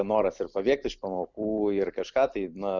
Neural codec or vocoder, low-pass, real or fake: none; 7.2 kHz; real